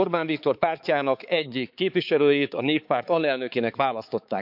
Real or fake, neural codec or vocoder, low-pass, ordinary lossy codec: fake; codec, 16 kHz, 4 kbps, X-Codec, HuBERT features, trained on balanced general audio; 5.4 kHz; none